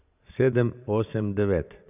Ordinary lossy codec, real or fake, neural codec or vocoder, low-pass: none; fake; vocoder, 22.05 kHz, 80 mel bands, Vocos; 3.6 kHz